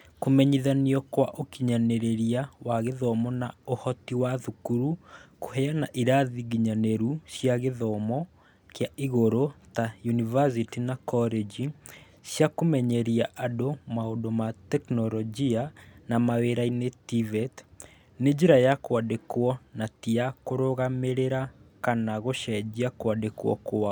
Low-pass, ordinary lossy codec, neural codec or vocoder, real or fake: none; none; none; real